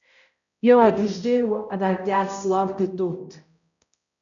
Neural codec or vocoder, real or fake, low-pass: codec, 16 kHz, 0.5 kbps, X-Codec, HuBERT features, trained on balanced general audio; fake; 7.2 kHz